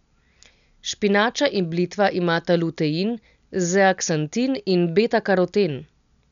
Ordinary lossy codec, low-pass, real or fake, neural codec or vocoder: none; 7.2 kHz; real; none